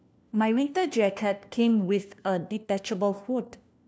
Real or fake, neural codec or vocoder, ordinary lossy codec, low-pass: fake; codec, 16 kHz, 1 kbps, FunCodec, trained on LibriTTS, 50 frames a second; none; none